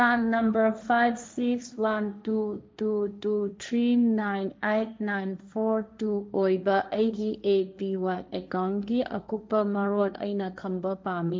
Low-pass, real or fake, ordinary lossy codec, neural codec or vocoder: none; fake; none; codec, 16 kHz, 1.1 kbps, Voila-Tokenizer